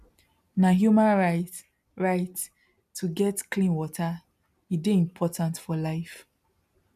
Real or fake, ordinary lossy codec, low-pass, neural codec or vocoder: real; none; 14.4 kHz; none